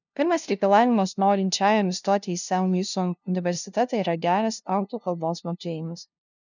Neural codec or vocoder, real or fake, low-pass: codec, 16 kHz, 0.5 kbps, FunCodec, trained on LibriTTS, 25 frames a second; fake; 7.2 kHz